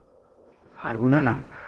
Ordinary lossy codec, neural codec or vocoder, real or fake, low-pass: Opus, 16 kbps; codec, 16 kHz in and 24 kHz out, 0.6 kbps, FocalCodec, streaming, 2048 codes; fake; 10.8 kHz